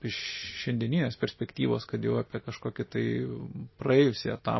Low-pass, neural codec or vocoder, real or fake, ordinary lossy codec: 7.2 kHz; none; real; MP3, 24 kbps